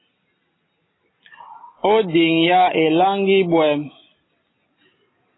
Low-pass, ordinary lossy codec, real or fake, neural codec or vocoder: 7.2 kHz; AAC, 16 kbps; real; none